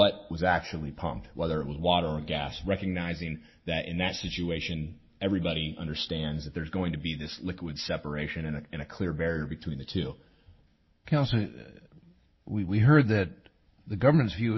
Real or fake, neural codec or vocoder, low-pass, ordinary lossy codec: real; none; 7.2 kHz; MP3, 24 kbps